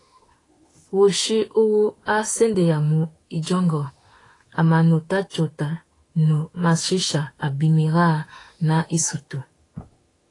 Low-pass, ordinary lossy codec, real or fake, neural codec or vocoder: 10.8 kHz; AAC, 32 kbps; fake; autoencoder, 48 kHz, 32 numbers a frame, DAC-VAE, trained on Japanese speech